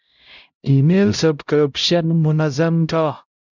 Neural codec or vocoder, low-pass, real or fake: codec, 16 kHz, 0.5 kbps, X-Codec, HuBERT features, trained on LibriSpeech; 7.2 kHz; fake